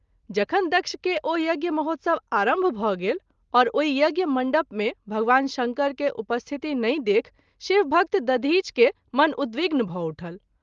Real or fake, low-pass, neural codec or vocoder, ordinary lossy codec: real; 7.2 kHz; none; Opus, 32 kbps